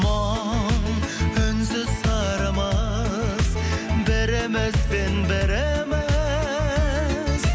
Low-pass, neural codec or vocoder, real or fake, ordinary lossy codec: none; none; real; none